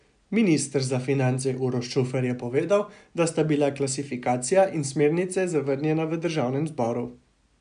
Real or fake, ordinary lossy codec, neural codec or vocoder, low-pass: real; none; none; 9.9 kHz